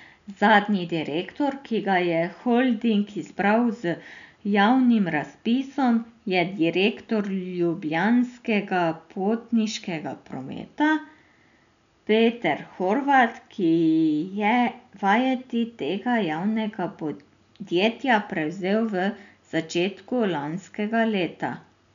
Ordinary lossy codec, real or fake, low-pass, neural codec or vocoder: none; real; 7.2 kHz; none